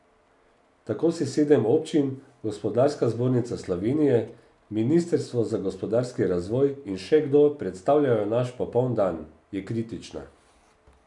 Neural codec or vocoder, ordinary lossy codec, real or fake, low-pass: none; none; real; 10.8 kHz